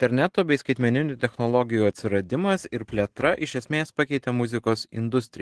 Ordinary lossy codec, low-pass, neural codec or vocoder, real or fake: Opus, 16 kbps; 10.8 kHz; none; real